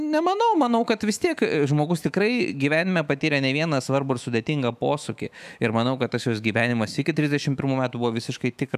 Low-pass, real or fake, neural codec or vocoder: 14.4 kHz; fake; autoencoder, 48 kHz, 128 numbers a frame, DAC-VAE, trained on Japanese speech